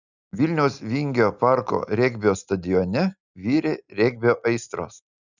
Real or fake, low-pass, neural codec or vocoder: real; 7.2 kHz; none